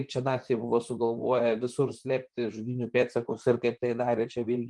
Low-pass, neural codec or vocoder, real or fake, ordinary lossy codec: 10.8 kHz; vocoder, 44.1 kHz, 128 mel bands, Pupu-Vocoder; fake; Opus, 32 kbps